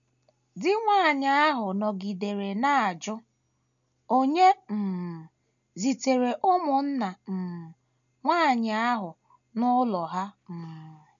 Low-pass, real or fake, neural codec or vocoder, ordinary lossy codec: 7.2 kHz; real; none; none